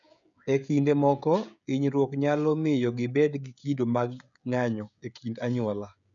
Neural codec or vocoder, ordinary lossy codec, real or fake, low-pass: codec, 16 kHz, 16 kbps, FreqCodec, smaller model; none; fake; 7.2 kHz